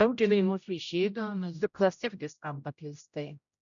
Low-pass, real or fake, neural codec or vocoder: 7.2 kHz; fake; codec, 16 kHz, 0.5 kbps, X-Codec, HuBERT features, trained on general audio